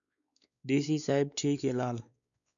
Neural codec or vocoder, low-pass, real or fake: codec, 16 kHz, 4 kbps, X-Codec, WavLM features, trained on Multilingual LibriSpeech; 7.2 kHz; fake